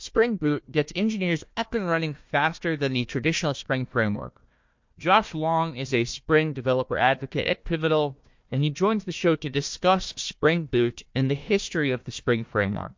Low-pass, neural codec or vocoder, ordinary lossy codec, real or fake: 7.2 kHz; codec, 16 kHz, 1 kbps, FunCodec, trained on Chinese and English, 50 frames a second; MP3, 48 kbps; fake